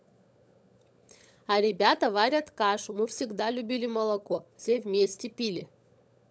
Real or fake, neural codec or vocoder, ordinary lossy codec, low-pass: fake; codec, 16 kHz, 16 kbps, FunCodec, trained on LibriTTS, 50 frames a second; none; none